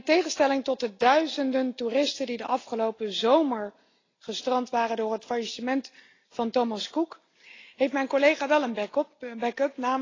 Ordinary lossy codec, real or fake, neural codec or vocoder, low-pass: AAC, 32 kbps; real; none; 7.2 kHz